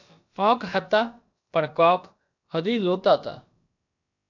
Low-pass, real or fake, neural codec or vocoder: 7.2 kHz; fake; codec, 16 kHz, about 1 kbps, DyCAST, with the encoder's durations